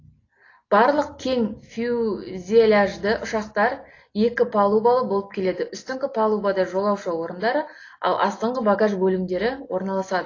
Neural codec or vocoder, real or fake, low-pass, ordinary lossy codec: none; real; 7.2 kHz; AAC, 32 kbps